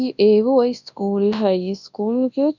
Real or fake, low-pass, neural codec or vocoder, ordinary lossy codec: fake; 7.2 kHz; codec, 24 kHz, 0.9 kbps, WavTokenizer, large speech release; none